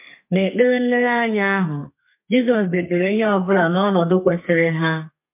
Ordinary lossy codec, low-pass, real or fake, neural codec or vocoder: MP3, 32 kbps; 3.6 kHz; fake; codec, 32 kHz, 1.9 kbps, SNAC